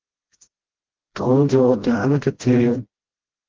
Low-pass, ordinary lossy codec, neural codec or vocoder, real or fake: 7.2 kHz; Opus, 16 kbps; codec, 16 kHz, 0.5 kbps, FreqCodec, smaller model; fake